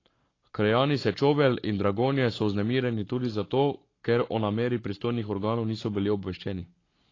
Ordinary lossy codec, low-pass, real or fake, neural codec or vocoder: AAC, 32 kbps; 7.2 kHz; fake; codec, 44.1 kHz, 7.8 kbps, Pupu-Codec